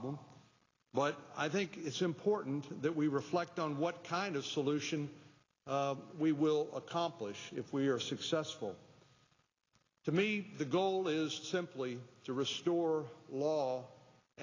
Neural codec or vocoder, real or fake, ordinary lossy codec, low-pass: none; real; AAC, 32 kbps; 7.2 kHz